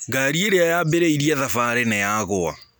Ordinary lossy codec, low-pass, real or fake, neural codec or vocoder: none; none; real; none